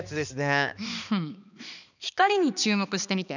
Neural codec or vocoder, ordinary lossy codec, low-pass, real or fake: codec, 16 kHz, 2 kbps, X-Codec, HuBERT features, trained on balanced general audio; none; 7.2 kHz; fake